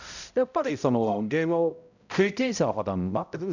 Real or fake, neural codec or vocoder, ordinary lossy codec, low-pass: fake; codec, 16 kHz, 0.5 kbps, X-Codec, HuBERT features, trained on balanced general audio; none; 7.2 kHz